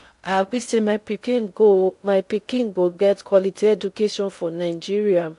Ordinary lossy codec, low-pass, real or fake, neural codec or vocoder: AAC, 64 kbps; 10.8 kHz; fake; codec, 16 kHz in and 24 kHz out, 0.6 kbps, FocalCodec, streaming, 2048 codes